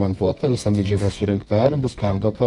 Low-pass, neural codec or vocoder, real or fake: 10.8 kHz; codec, 24 kHz, 0.9 kbps, WavTokenizer, medium music audio release; fake